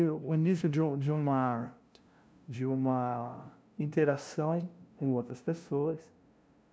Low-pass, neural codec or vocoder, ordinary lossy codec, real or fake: none; codec, 16 kHz, 0.5 kbps, FunCodec, trained on LibriTTS, 25 frames a second; none; fake